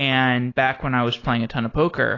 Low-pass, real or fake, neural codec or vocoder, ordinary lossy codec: 7.2 kHz; real; none; AAC, 32 kbps